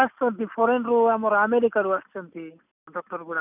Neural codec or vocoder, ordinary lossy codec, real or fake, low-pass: none; none; real; 3.6 kHz